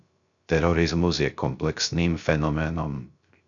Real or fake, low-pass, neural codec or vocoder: fake; 7.2 kHz; codec, 16 kHz, 0.3 kbps, FocalCodec